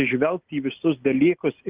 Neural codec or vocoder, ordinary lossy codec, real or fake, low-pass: none; Opus, 32 kbps; real; 3.6 kHz